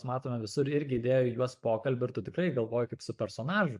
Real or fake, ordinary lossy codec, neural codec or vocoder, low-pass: fake; AAC, 64 kbps; vocoder, 24 kHz, 100 mel bands, Vocos; 10.8 kHz